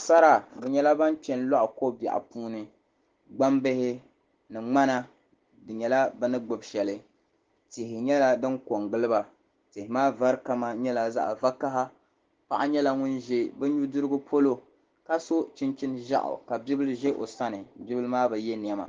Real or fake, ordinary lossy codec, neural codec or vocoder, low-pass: real; Opus, 16 kbps; none; 7.2 kHz